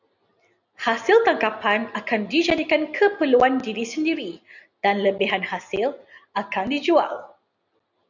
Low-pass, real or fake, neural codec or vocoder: 7.2 kHz; real; none